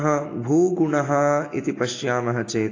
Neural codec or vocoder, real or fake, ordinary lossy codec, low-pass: none; real; AAC, 32 kbps; 7.2 kHz